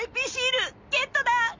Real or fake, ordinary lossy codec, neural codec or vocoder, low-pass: real; MP3, 64 kbps; none; 7.2 kHz